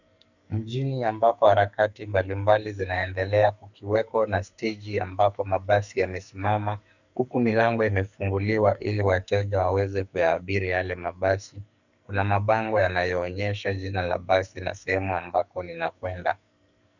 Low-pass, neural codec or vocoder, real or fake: 7.2 kHz; codec, 44.1 kHz, 2.6 kbps, SNAC; fake